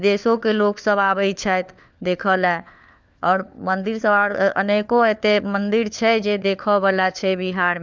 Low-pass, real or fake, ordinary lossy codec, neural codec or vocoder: none; fake; none; codec, 16 kHz, 4 kbps, FunCodec, trained on LibriTTS, 50 frames a second